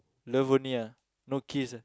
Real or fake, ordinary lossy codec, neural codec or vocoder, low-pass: real; none; none; none